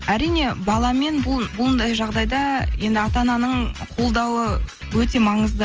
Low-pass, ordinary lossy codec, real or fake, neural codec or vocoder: 7.2 kHz; Opus, 24 kbps; real; none